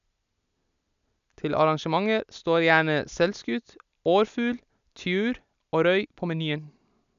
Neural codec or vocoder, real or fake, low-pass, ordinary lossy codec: none; real; 7.2 kHz; none